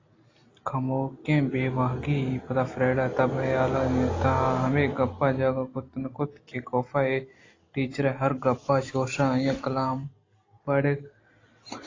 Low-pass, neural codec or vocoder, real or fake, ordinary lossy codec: 7.2 kHz; none; real; AAC, 32 kbps